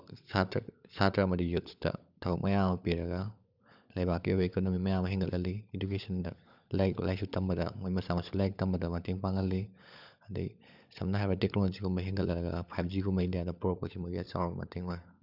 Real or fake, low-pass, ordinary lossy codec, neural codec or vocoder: fake; 5.4 kHz; none; codec, 16 kHz, 16 kbps, FunCodec, trained on LibriTTS, 50 frames a second